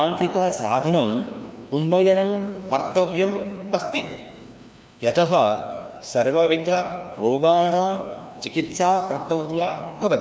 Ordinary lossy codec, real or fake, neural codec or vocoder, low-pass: none; fake; codec, 16 kHz, 1 kbps, FreqCodec, larger model; none